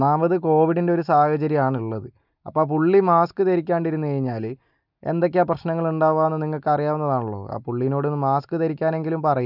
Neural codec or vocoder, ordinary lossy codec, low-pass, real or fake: none; none; 5.4 kHz; real